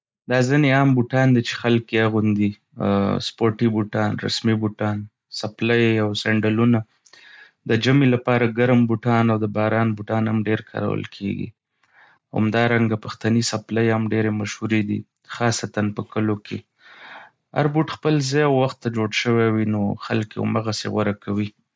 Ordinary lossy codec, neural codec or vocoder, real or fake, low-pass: none; none; real; none